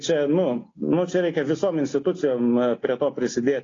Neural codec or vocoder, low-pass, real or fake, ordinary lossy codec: none; 7.2 kHz; real; AAC, 32 kbps